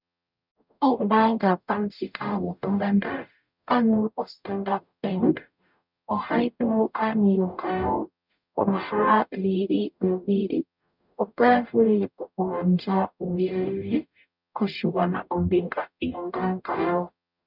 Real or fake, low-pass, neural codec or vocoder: fake; 5.4 kHz; codec, 44.1 kHz, 0.9 kbps, DAC